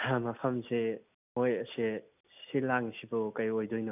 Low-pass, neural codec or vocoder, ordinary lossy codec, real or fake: 3.6 kHz; none; none; real